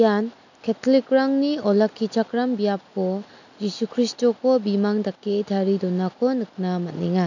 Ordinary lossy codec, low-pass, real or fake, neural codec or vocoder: none; 7.2 kHz; real; none